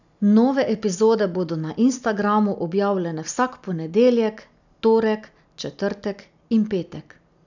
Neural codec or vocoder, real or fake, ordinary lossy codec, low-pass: none; real; none; 7.2 kHz